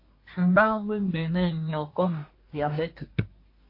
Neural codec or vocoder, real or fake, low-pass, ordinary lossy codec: codec, 24 kHz, 1 kbps, SNAC; fake; 5.4 kHz; MP3, 32 kbps